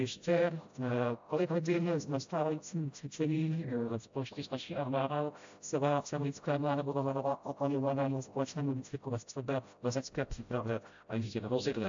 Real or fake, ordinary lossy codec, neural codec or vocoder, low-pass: fake; AAC, 64 kbps; codec, 16 kHz, 0.5 kbps, FreqCodec, smaller model; 7.2 kHz